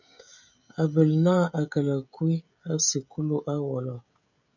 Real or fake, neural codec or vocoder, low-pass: fake; codec, 16 kHz, 16 kbps, FreqCodec, smaller model; 7.2 kHz